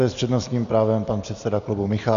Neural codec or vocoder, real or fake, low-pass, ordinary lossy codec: none; real; 7.2 kHz; MP3, 96 kbps